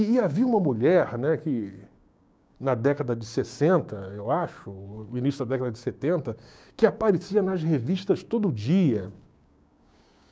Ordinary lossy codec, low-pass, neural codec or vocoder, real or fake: none; none; codec, 16 kHz, 6 kbps, DAC; fake